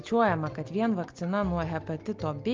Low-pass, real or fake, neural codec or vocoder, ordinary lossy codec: 7.2 kHz; real; none; Opus, 32 kbps